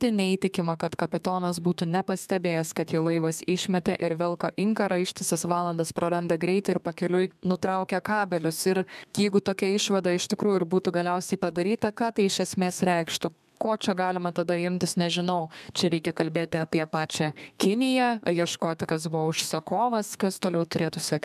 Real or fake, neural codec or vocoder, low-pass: fake; codec, 32 kHz, 1.9 kbps, SNAC; 14.4 kHz